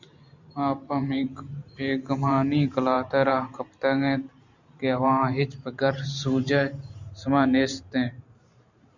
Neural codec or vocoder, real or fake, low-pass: vocoder, 44.1 kHz, 128 mel bands every 512 samples, BigVGAN v2; fake; 7.2 kHz